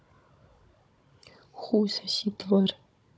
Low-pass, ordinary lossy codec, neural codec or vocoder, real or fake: none; none; codec, 16 kHz, 16 kbps, FunCodec, trained on Chinese and English, 50 frames a second; fake